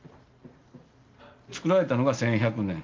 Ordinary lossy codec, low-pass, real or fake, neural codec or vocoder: Opus, 32 kbps; 7.2 kHz; real; none